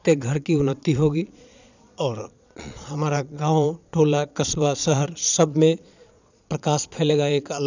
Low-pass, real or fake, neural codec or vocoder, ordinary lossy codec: 7.2 kHz; fake; vocoder, 22.05 kHz, 80 mel bands, Vocos; none